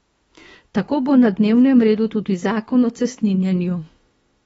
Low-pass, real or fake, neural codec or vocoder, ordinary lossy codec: 19.8 kHz; fake; autoencoder, 48 kHz, 32 numbers a frame, DAC-VAE, trained on Japanese speech; AAC, 24 kbps